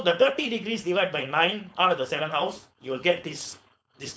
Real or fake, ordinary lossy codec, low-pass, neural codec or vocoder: fake; none; none; codec, 16 kHz, 4.8 kbps, FACodec